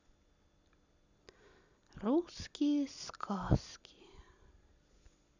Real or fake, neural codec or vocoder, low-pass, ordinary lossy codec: real; none; 7.2 kHz; none